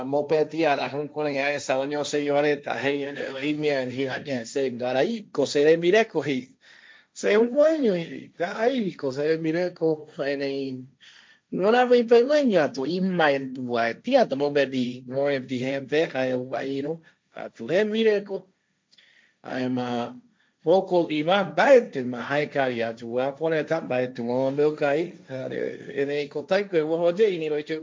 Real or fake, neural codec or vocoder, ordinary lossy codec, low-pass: fake; codec, 16 kHz, 1.1 kbps, Voila-Tokenizer; none; none